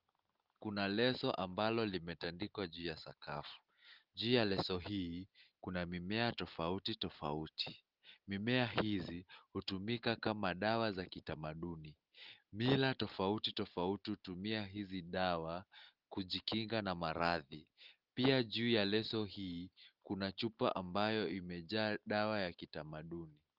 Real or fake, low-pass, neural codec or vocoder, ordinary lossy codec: real; 5.4 kHz; none; Opus, 32 kbps